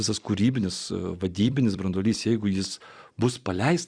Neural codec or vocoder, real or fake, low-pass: vocoder, 44.1 kHz, 128 mel bands every 256 samples, BigVGAN v2; fake; 9.9 kHz